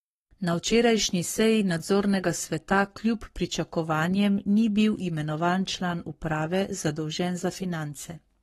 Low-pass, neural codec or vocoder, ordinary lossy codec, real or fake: 19.8 kHz; codec, 44.1 kHz, 7.8 kbps, Pupu-Codec; AAC, 32 kbps; fake